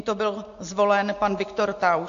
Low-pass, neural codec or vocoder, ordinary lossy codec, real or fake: 7.2 kHz; none; AAC, 48 kbps; real